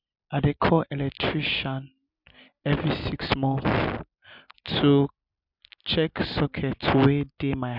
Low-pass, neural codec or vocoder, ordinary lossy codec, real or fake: 5.4 kHz; none; none; real